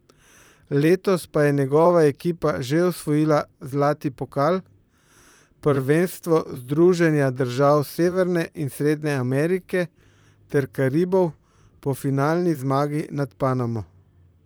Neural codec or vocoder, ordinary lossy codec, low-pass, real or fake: vocoder, 44.1 kHz, 128 mel bands, Pupu-Vocoder; none; none; fake